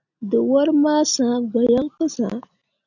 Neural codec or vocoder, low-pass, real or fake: none; 7.2 kHz; real